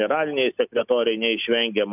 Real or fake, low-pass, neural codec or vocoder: real; 3.6 kHz; none